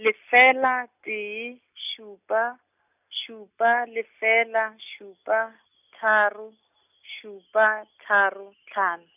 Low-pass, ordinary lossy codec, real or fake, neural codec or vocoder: 3.6 kHz; none; real; none